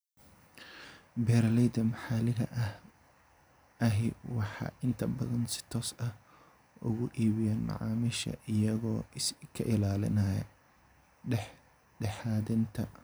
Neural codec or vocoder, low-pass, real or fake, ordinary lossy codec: none; none; real; none